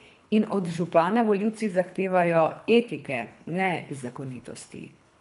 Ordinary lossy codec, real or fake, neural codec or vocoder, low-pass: none; fake; codec, 24 kHz, 3 kbps, HILCodec; 10.8 kHz